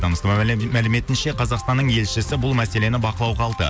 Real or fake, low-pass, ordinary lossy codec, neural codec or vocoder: real; none; none; none